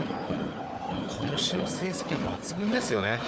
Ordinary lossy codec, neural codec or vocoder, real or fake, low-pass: none; codec, 16 kHz, 4 kbps, FunCodec, trained on Chinese and English, 50 frames a second; fake; none